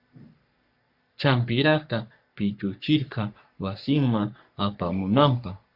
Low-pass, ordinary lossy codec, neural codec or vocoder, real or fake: 5.4 kHz; Opus, 64 kbps; codec, 44.1 kHz, 3.4 kbps, Pupu-Codec; fake